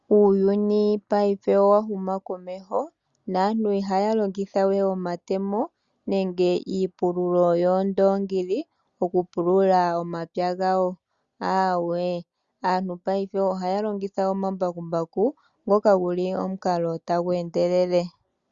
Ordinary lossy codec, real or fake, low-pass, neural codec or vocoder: Opus, 64 kbps; real; 7.2 kHz; none